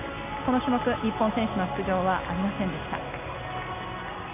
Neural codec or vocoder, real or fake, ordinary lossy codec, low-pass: none; real; none; 3.6 kHz